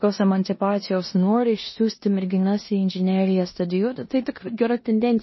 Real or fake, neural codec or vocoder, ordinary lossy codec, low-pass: fake; codec, 16 kHz in and 24 kHz out, 0.9 kbps, LongCat-Audio-Codec, four codebook decoder; MP3, 24 kbps; 7.2 kHz